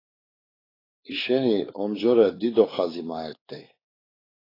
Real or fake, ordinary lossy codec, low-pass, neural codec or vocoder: fake; AAC, 24 kbps; 5.4 kHz; codec, 16 kHz, 4 kbps, X-Codec, WavLM features, trained on Multilingual LibriSpeech